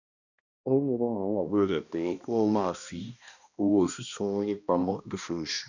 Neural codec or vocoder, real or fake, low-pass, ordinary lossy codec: codec, 16 kHz, 1 kbps, X-Codec, HuBERT features, trained on balanced general audio; fake; 7.2 kHz; none